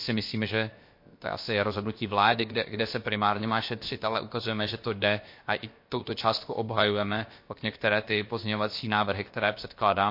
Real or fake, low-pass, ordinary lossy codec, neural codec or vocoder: fake; 5.4 kHz; MP3, 32 kbps; codec, 16 kHz, about 1 kbps, DyCAST, with the encoder's durations